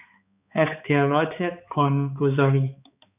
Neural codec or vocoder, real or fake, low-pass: codec, 16 kHz, 4 kbps, X-Codec, HuBERT features, trained on balanced general audio; fake; 3.6 kHz